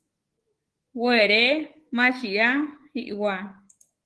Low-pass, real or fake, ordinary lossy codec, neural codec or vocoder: 10.8 kHz; fake; Opus, 16 kbps; codec, 24 kHz, 3.1 kbps, DualCodec